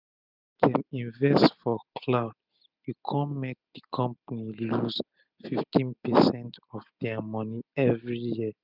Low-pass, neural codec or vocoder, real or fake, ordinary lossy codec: 5.4 kHz; none; real; none